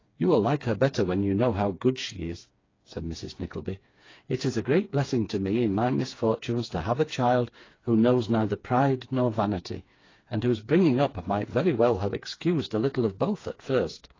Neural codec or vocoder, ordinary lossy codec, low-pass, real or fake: codec, 16 kHz, 4 kbps, FreqCodec, smaller model; AAC, 32 kbps; 7.2 kHz; fake